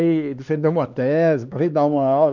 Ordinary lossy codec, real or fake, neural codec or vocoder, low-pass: none; fake; codec, 16 kHz, 2 kbps, X-Codec, HuBERT features, trained on LibriSpeech; 7.2 kHz